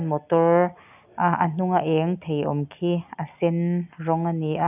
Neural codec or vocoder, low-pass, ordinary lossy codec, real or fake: none; 3.6 kHz; none; real